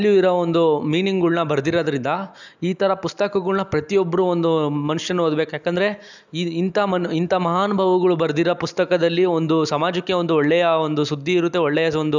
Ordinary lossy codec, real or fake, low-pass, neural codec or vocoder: none; real; 7.2 kHz; none